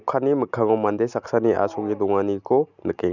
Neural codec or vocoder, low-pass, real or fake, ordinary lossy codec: none; 7.2 kHz; real; none